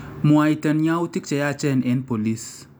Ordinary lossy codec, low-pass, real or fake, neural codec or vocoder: none; none; real; none